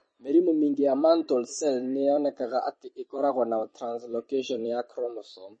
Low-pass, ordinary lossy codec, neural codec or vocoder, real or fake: 9.9 kHz; MP3, 32 kbps; vocoder, 44.1 kHz, 128 mel bands every 256 samples, BigVGAN v2; fake